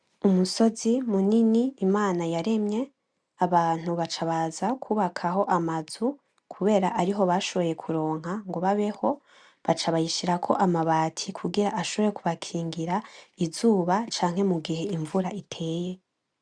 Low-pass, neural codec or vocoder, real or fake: 9.9 kHz; none; real